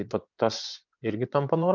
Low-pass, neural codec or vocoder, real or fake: 7.2 kHz; vocoder, 24 kHz, 100 mel bands, Vocos; fake